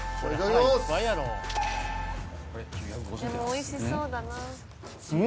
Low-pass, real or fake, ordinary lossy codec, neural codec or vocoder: none; real; none; none